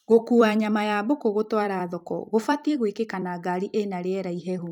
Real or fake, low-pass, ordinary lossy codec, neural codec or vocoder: fake; 19.8 kHz; none; vocoder, 44.1 kHz, 128 mel bands every 256 samples, BigVGAN v2